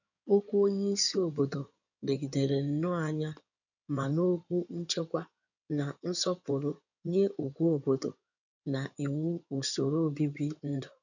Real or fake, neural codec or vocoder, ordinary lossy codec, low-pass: fake; codec, 16 kHz in and 24 kHz out, 2.2 kbps, FireRedTTS-2 codec; none; 7.2 kHz